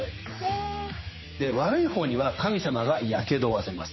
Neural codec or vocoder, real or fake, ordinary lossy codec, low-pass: codec, 16 kHz, 4 kbps, X-Codec, HuBERT features, trained on general audio; fake; MP3, 24 kbps; 7.2 kHz